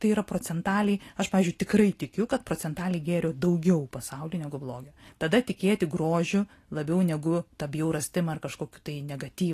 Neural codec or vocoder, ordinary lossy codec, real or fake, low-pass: none; AAC, 48 kbps; real; 14.4 kHz